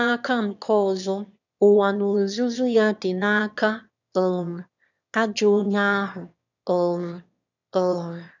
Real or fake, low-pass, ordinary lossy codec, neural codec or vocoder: fake; 7.2 kHz; none; autoencoder, 22.05 kHz, a latent of 192 numbers a frame, VITS, trained on one speaker